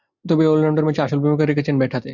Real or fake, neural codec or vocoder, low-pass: real; none; 7.2 kHz